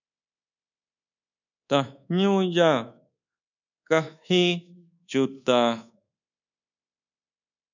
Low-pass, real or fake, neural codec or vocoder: 7.2 kHz; fake; codec, 24 kHz, 1.2 kbps, DualCodec